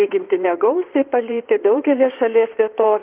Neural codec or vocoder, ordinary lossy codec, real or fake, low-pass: codec, 16 kHz in and 24 kHz out, 2.2 kbps, FireRedTTS-2 codec; Opus, 32 kbps; fake; 3.6 kHz